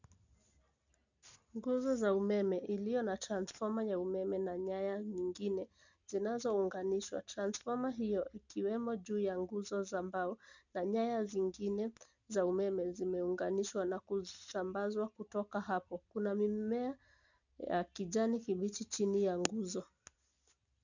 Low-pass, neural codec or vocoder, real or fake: 7.2 kHz; none; real